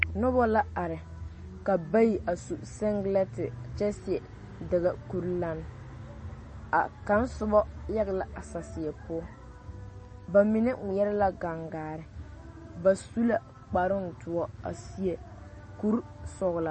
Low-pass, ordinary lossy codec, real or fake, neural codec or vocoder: 10.8 kHz; MP3, 32 kbps; real; none